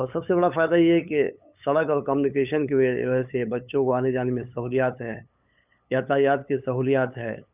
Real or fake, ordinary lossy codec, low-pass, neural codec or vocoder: fake; none; 3.6 kHz; codec, 16 kHz, 8 kbps, FunCodec, trained on LibriTTS, 25 frames a second